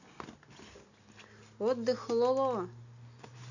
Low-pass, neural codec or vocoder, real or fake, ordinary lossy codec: 7.2 kHz; none; real; none